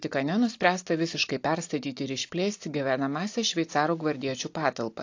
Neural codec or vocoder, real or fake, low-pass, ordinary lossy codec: none; real; 7.2 kHz; AAC, 48 kbps